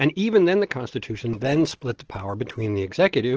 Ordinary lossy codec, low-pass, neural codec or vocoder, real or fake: Opus, 16 kbps; 7.2 kHz; none; real